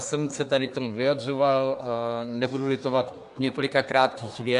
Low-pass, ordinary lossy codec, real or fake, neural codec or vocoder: 10.8 kHz; AAC, 64 kbps; fake; codec, 24 kHz, 1 kbps, SNAC